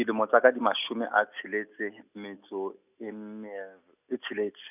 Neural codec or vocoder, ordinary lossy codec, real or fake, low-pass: none; none; real; 3.6 kHz